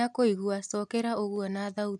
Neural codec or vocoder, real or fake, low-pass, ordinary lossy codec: none; real; none; none